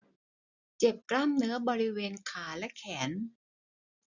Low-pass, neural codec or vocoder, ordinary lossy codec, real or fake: 7.2 kHz; none; none; real